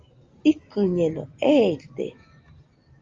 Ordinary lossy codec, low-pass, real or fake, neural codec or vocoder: Opus, 32 kbps; 7.2 kHz; real; none